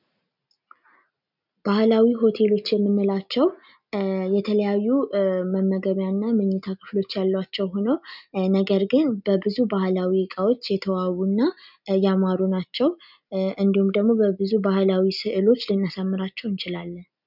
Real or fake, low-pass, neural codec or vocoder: real; 5.4 kHz; none